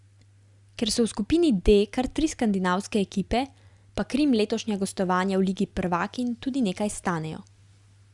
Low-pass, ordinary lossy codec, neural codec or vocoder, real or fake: 10.8 kHz; Opus, 64 kbps; none; real